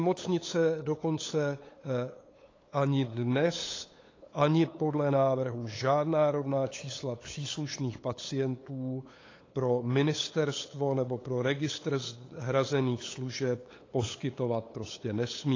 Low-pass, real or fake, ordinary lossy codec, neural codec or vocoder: 7.2 kHz; fake; AAC, 32 kbps; codec, 16 kHz, 8 kbps, FunCodec, trained on LibriTTS, 25 frames a second